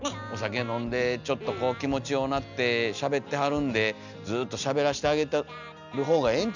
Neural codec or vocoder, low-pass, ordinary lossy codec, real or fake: none; 7.2 kHz; none; real